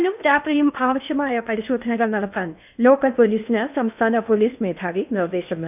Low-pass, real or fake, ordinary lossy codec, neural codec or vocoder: 3.6 kHz; fake; none; codec, 16 kHz in and 24 kHz out, 0.8 kbps, FocalCodec, streaming, 65536 codes